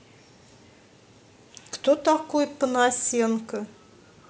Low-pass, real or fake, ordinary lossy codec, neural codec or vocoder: none; real; none; none